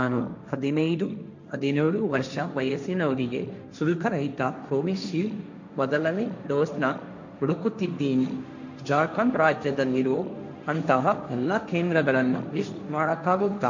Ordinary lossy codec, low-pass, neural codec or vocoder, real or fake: none; 7.2 kHz; codec, 16 kHz, 1.1 kbps, Voila-Tokenizer; fake